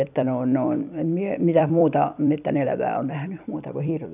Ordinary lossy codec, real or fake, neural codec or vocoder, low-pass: none; fake; vocoder, 44.1 kHz, 128 mel bands every 256 samples, BigVGAN v2; 3.6 kHz